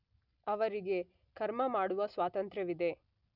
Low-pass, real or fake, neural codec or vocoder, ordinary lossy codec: 5.4 kHz; real; none; none